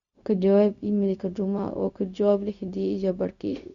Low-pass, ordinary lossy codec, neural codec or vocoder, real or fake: 7.2 kHz; none; codec, 16 kHz, 0.4 kbps, LongCat-Audio-Codec; fake